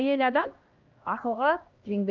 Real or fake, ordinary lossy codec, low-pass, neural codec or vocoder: fake; Opus, 24 kbps; 7.2 kHz; codec, 16 kHz, 1 kbps, X-Codec, HuBERT features, trained on LibriSpeech